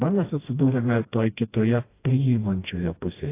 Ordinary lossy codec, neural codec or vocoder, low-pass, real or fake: AAC, 24 kbps; codec, 16 kHz, 1 kbps, FreqCodec, smaller model; 3.6 kHz; fake